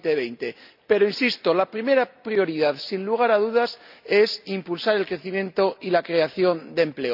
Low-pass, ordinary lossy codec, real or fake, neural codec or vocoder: 5.4 kHz; none; real; none